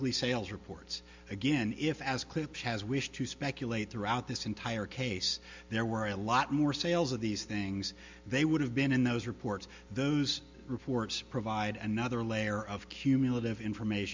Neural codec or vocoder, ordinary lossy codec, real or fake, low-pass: none; MP3, 64 kbps; real; 7.2 kHz